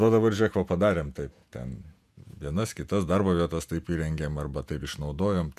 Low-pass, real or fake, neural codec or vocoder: 14.4 kHz; fake; vocoder, 48 kHz, 128 mel bands, Vocos